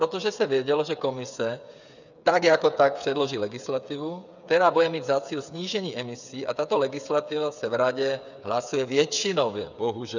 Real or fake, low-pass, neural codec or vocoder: fake; 7.2 kHz; codec, 16 kHz, 8 kbps, FreqCodec, smaller model